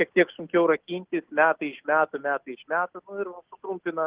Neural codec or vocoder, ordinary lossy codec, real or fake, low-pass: none; Opus, 16 kbps; real; 3.6 kHz